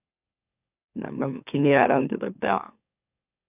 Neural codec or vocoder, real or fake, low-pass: autoencoder, 44.1 kHz, a latent of 192 numbers a frame, MeloTTS; fake; 3.6 kHz